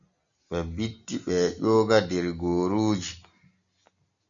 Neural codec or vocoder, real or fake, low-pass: none; real; 7.2 kHz